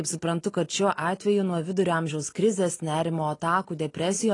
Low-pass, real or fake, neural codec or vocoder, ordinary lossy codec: 10.8 kHz; real; none; AAC, 32 kbps